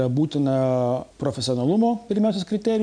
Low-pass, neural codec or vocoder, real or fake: 9.9 kHz; none; real